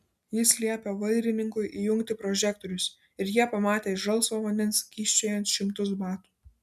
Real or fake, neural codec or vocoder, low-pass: real; none; 14.4 kHz